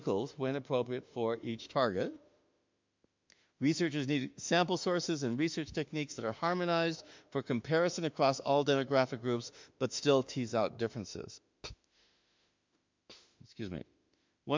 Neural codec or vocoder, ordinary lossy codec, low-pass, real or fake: autoencoder, 48 kHz, 32 numbers a frame, DAC-VAE, trained on Japanese speech; MP3, 64 kbps; 7.2 kHz; fake